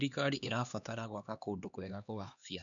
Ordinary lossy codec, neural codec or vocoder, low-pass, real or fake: none; codec, 16 kHz, 2 kbps, X-Codec, HuBERT features, trained on LibriSpeech; 7.2 kHz; fake